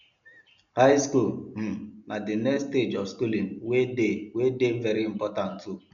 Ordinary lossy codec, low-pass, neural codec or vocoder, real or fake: none; 7.2 kHz; none; real